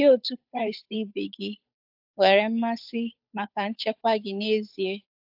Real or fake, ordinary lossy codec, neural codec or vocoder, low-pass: fake; AAC, 48 kbps; codec, 16 kHz, 8 kbps, FunCodec, trained on Chinese and English, 25 frames a second; 5.4 kHz